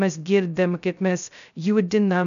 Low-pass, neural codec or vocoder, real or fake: 7.2 kHz; codec, 16 kHz, 0.2 kbps, FocalCodec; fake